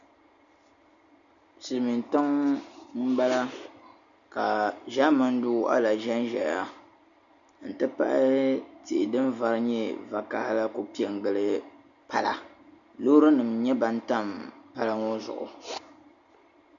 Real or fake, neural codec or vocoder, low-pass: real; none; 7.2 kHz